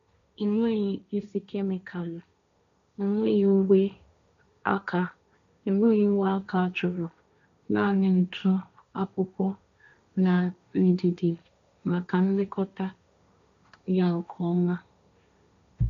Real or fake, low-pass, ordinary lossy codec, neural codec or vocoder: fake; 7.2 kHz; AAC, 96 kbps; codec, 16 kHz, 1.1 kbps, Voila-Tokenizer